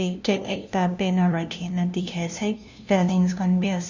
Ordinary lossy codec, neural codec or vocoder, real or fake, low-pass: none; codec, 16 kHz, 0.5 kbps, FunCodec, trained on LibriTTS, 25 frames a second; fake; 7.2 kHz